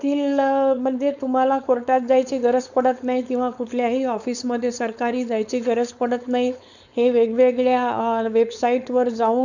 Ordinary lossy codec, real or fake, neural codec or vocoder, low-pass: none; fake; codec, 16 kHz, 4.8 kbps, FACodec; 7.2 kHz